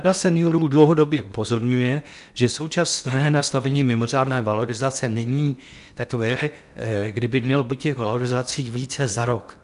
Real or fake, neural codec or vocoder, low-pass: fake; codec, 16 kHz in and 24 kHz out, 0.8 kbps, FocalCodec, streaming, 65536 codes; 10.8 kHz